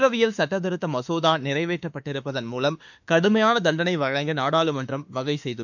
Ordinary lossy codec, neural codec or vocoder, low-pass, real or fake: none; autoencoder, 48 kHz, 32 numbers a frame, DAC-VAE, trained on Japanese speech; 7.2 kHz; fake